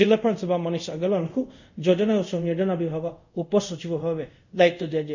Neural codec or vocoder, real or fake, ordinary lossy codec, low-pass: codec, 24 kHz, 0.5 kbps, DualCodec; fake; none; 7.2 kHz